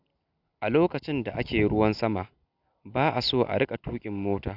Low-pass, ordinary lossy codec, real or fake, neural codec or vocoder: 5.4 kHz; none; real; none